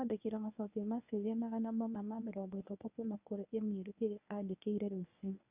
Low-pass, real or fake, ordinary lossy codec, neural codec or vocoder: 3.6 kHz; fake; none; codec, 24 kHz, 0.9 kbps, WavTokenizer, medium speech release version 1